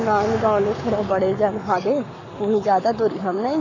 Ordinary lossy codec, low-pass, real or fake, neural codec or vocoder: none; 7.2 kHz; fake; codec, 44.1 kHz, 7.8 kbps, Pupu-Codec